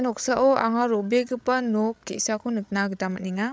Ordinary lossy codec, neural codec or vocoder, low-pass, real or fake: none; codec, 16 kHz, 8 kbps, FreqCodec, larger model; none; fake